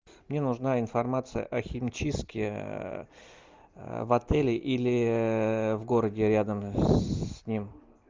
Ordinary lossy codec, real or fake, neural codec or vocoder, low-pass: Opus, 24 kbps; real; none; 7.2 kHz